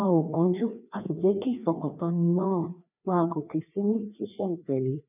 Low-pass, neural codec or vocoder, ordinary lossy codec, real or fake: 3.6 kHz; codec, 16 kHz, 2 kbps, FreqCodec, larger model; none; fake